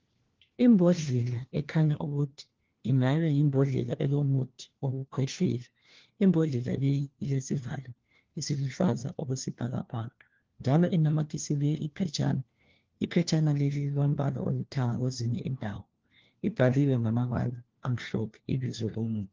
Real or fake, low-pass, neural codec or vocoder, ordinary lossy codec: fake; 7.2 kHz; codec, 16 kHz, 1 kbps, FunCodec, trained on LibriTTS, 50 frames a second; Opus, 16 kbps